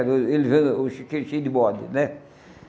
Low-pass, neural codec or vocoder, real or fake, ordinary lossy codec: none; none; real; none